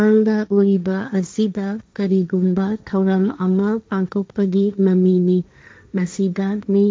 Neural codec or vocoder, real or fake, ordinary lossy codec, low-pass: codec, 16 kHz, 1.1 kbps, Voila-Tokenizer; fake; none; none